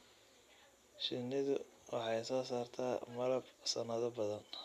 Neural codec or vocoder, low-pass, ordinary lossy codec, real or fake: none; 14.4 kHz; none; real